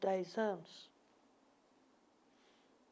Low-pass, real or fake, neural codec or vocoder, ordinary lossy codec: none; real; none; none